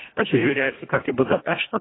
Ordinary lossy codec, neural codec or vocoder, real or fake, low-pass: AAC, 16 kbps; codec, 24 kHz, 1.5 kbps, HILCodec; fake; 7.2 kHz